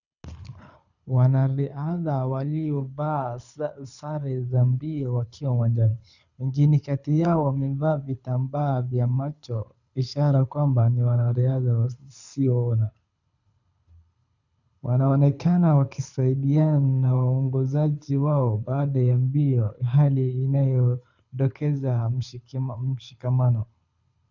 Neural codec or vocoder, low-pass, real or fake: codec, 24 kHz, 6 kbps, HILCodec; 7.2 kHz; fake